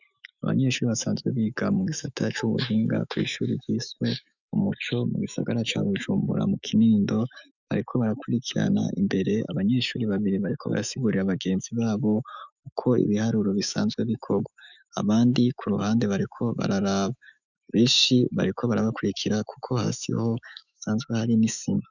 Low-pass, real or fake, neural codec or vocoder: 7.2 kHz; fake; autoencoder, 48 kHz, 128 numbers a frame, DAC-VAE, trained on Japanese speech